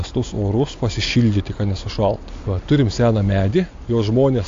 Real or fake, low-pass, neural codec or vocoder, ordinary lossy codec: real; 7.2 kHz; none; AAC, 48 kbps